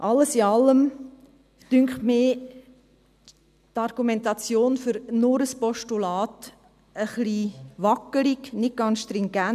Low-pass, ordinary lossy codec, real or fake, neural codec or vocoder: 14.4 kHz; none; real; none